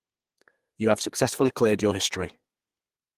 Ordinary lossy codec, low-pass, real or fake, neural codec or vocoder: Opus, 24 kbps; 14.4 kHz; fake; codec, 32 kHz, 1.9 kbps, SNAC